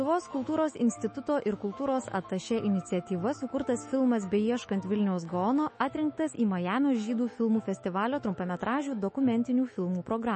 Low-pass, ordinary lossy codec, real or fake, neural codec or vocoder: 10.8 kHz; MP3, 32 kbps; fake; autoencoder, 48 kHz, 128 numbers a frame, DAC-VAE, trained on Japanese speech